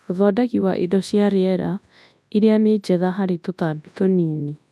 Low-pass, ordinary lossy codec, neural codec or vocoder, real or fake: none; none; codec, 24 kHz, 0.9 kbps, WavTokenizer, large speech release; fake